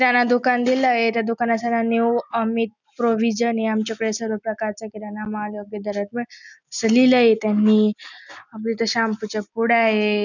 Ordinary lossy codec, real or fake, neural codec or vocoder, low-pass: none; real; none; 7.2 kHz